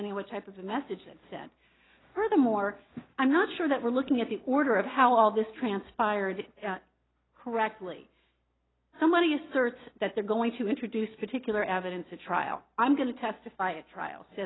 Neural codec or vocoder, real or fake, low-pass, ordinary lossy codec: none; real; 7.2 kHz; AAC, 16 kbps